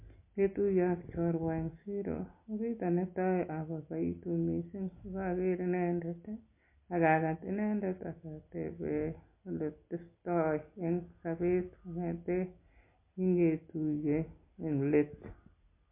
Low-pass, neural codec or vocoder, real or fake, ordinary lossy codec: 3.6 kHz; none; real; MP3, 24 kbps